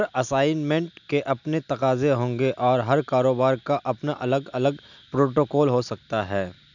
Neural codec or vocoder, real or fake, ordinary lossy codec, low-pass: none; real; none; 7.2 kHz